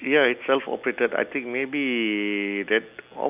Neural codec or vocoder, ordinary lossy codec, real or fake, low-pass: none; none; real; 3.6 kHz